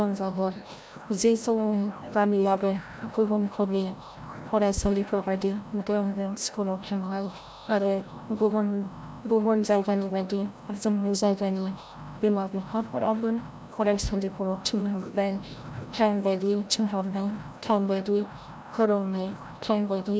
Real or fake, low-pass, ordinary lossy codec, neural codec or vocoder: fake; none; none; codec, 16 kHz, 0.5 kbps, FreqCodec, larger model